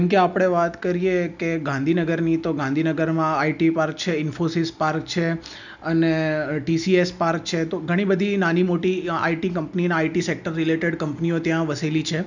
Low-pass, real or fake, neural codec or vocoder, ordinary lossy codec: 7.2 kHz; real; none; none